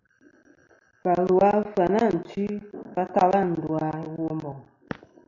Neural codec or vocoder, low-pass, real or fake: none; 7.2 kHz; real